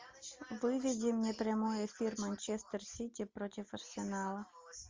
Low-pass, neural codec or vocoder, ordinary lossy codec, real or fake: 7.2 kHz; none; Opus, 32 kbps; real